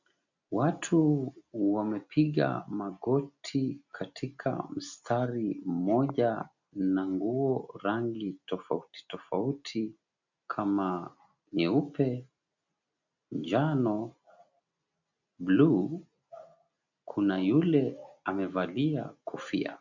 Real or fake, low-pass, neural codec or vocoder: real; 7.2 kHz; none